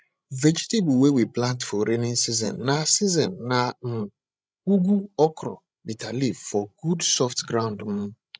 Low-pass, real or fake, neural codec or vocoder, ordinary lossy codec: none; fake; codec, 16 kHz, 16 kbps, FreqCodec, larger model; none